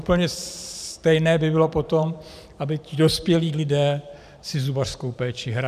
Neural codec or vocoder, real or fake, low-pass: vocoder, 44.1 kHz, 128 mel bands every 512 samples, BigVGAN v2; fake; 14.4 kHz